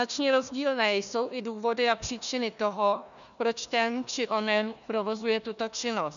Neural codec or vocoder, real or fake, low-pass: codec, 16 kHz, 1 kbps, FunCodec, trained on Chinese and English, 50 frames a second; fake; 7.2 kHz